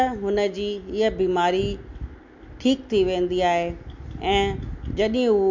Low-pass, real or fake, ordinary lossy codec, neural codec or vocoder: 7.2 kHz; real; MP3, 48 kbps; none